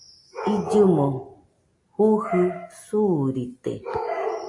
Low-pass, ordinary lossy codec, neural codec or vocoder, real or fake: 10.8 kHz; AAC, 48 kbps; none; real